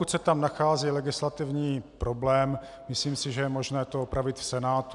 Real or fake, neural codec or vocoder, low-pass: real; none; 10.8 kHz